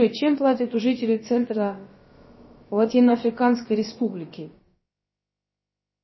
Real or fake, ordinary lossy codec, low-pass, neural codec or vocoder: fake; MP3, 24 kbps; 7.2 kHz; codec, 16 kHz, about 1 kbps, DyCAST, with the encoder's durations